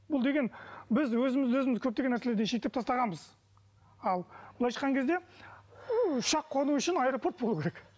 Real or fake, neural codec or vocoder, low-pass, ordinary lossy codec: real; none; none; none